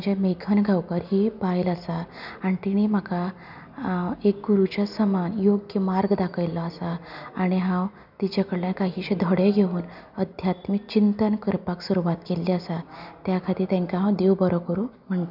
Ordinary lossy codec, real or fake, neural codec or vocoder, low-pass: none; real; none; 5.4 kHz